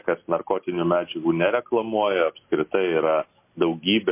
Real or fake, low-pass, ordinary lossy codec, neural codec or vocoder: real; 3.6 kHz; MP3, 24 kbps; none